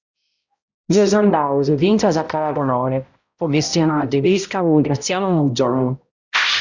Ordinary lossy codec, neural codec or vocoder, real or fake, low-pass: Opus, 64 kbps; codec, 16 kHz, 0.5 kbps, X-Codec, HuBERT features, trained on balanced general audio; fake; 7.2 kHz